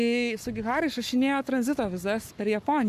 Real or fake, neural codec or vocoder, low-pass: fake; codec, 44.1 kHz, 7.8 kbps, Pupu-Codec; 14.4 kHz